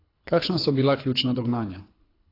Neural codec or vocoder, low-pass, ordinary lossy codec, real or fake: codec, 24 kHz, 6 kbps, HILCodec; 5.4 kHz; AAC, 24 kbps; fake